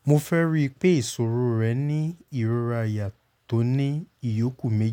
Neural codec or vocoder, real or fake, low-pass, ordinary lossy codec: none; real; 19.8 kHz; none